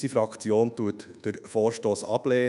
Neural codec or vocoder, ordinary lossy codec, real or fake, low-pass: codec, 24 kHz, 1.2 kbps, DualCodec; none; fake; 10.8 kHz